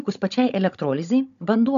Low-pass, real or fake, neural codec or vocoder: 7.2 kHz; fake; codec, 16 kHz, 16 kbps, FunCodec, trained on Chinese and English, 50 frames a second